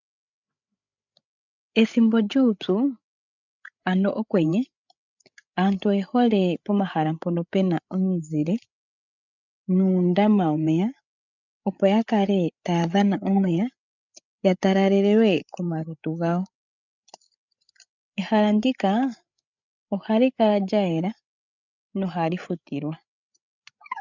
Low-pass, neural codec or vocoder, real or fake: 7.2 kHz; codec, 16 kHz, 8 kbps, FreqCodec, larger model; fake